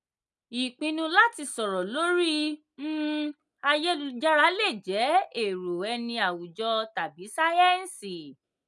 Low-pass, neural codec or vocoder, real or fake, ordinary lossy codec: none; none; real; none